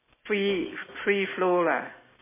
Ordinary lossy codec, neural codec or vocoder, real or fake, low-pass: MP3, 16 kbps; none; real; 3.6 kHz